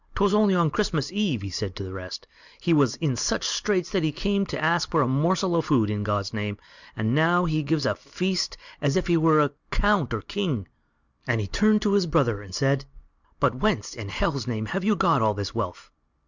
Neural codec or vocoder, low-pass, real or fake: none; 7.2 kHz; real